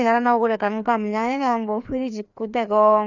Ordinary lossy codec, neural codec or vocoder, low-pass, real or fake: none; codec, 16 kHz, 2 kbps, FreqCodec, larger model; 7.2 kHz; fake